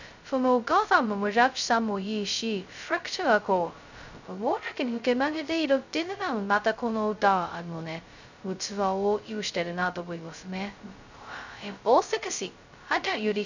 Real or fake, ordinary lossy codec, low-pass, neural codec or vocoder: fake; none; 7.2 kHz; codec, 16 kHz, 0.2 kbps, FocalCodec